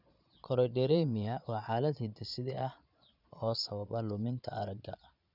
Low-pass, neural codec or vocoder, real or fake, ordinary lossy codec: 5.4 kHz; vocoder, 22.05 kHz, 80 mel bands, Vocos; fake; none